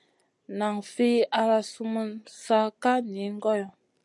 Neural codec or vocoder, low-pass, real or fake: none; 10.8 kHz; real